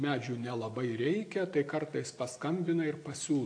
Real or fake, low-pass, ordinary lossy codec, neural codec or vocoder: real; 9.9 kHz; AAC, 48 kbps; none